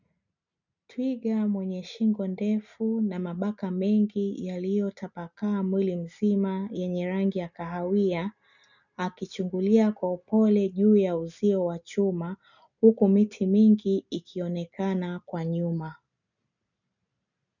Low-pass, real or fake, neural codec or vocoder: 7.2 kHz; real; none